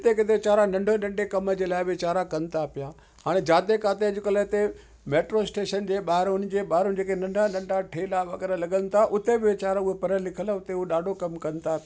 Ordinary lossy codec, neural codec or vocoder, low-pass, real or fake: none; none; none; real